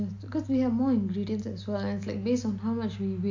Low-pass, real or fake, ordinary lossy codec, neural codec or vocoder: 7.2 kHz; real; AAC, 48 kbps; none